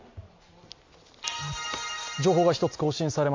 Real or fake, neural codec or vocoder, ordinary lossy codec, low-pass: real; none; MP3, 48 kbps; 7.2 kHz